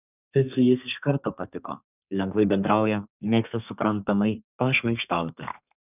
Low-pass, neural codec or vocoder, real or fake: 3.6 kHz; codec, 32 kHz, 1.9 kbps, SNAC; fake